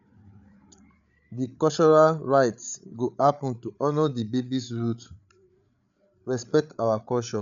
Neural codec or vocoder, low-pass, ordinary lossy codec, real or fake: codec, 16 kHz, 8 kbps, FreqCodec, larger model; 7.2 kHz; none; fake